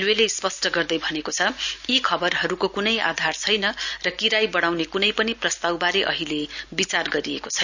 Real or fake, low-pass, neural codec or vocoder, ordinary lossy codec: real; 7.2 kHz; none; none